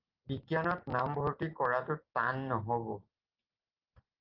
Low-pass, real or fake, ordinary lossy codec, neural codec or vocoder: 5.4 kHz; real; Opus, 24 kbps; none